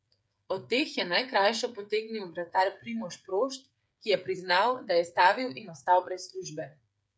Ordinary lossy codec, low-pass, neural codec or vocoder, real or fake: none; none; codec, 16 kHz, 8 kbps, FreqCodec, smaller model; fake